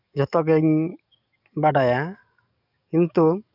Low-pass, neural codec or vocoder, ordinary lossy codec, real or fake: 5.4 kHz; codec, 44.1 kHz, 7.8 kbps, DAC; none; fake